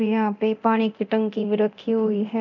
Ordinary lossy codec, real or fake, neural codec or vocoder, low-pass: none; fake; codec, 24 kHz, 0.9 kbps, DualCodec; 7.2 kHz